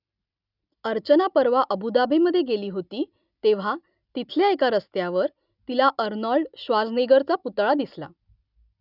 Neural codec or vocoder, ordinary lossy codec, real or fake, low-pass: none; none; real; 5.4 kHz